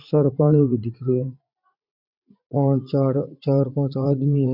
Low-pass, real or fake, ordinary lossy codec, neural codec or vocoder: 5.4 kHz; fake; none; vocoder, 44.1 kHz, 80 mel bands, Vocos